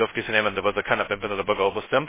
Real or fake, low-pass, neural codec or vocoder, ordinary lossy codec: fake; 3.6 kHz; codec, 16 kHz, 0.2 kbps, FocalCodec; MP3, 16 kbps